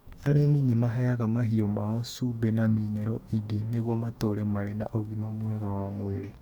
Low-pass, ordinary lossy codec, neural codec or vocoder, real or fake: 19.8 kHz; none; codec, 44.1 kHz, 2.6 kbps, DAC; fake